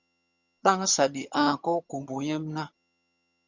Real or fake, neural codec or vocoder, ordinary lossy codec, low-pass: fake; vocoder, 22.05 kHz, 80 mel bands, HiFi-GAN; Opus, 64 kbps; 7.2 kHz